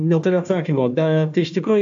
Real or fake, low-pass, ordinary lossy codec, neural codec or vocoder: fake; 7.2 kHz; AAC, 64 kbps; codec, 16 kHz, 1 kbps, FunCodec, trained on Chinese and English, 50 frames a second